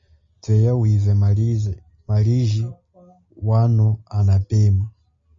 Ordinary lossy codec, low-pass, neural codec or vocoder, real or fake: MP3, 32 kbps; 7.2 kHz; none; real